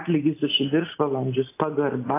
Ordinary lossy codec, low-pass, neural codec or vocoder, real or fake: AAC, 24 kbps; 3.6 kHz; none; real